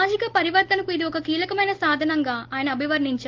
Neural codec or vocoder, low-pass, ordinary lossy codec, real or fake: none; 7.2 kHz; Opus, 16 kbps; real